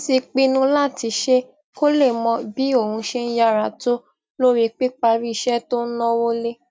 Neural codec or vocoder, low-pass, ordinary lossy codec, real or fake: none; none; none; real